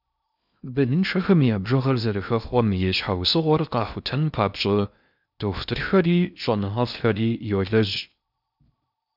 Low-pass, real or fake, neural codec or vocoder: 5.4 kHz; fake; codec, 16 kHz in and 24 kHz out, 0.6 kbps, FocalCodec, streaming, 2048 codes